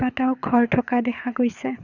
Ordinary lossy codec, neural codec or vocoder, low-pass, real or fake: Opus, 64 kbps; codec, 24 kHz, 6 kbps, HILCodec; 7.2 kHz; fake